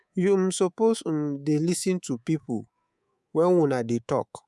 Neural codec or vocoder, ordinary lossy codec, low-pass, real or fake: codec, 24 kHz, 3.1 kbps, DualCodec; none; none; fake